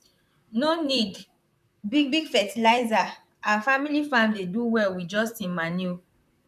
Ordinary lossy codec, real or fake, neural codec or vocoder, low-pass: none; fake; vocoder, 44.1 kHz, 128 mel bands, Pupu-Vocoder; 14.4 kHz